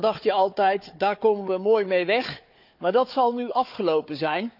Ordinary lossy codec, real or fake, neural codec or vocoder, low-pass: none; fake; codec, 16 kHz, 4 kbps, FunCodec, trained on Chinese and English, 50 frames a second; 5.4 kHz